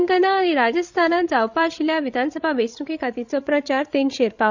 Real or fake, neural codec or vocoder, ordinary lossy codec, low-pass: fake; codec, 16 kHz, 16 kbps, FreqCodec, larger model; none; 7.2 kHz